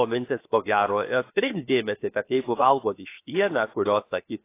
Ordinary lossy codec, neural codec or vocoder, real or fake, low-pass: AAC, 24 kbps; codec, 16 kHz, 0.7 kbps, FocalCodec; fake; 3.6 kHz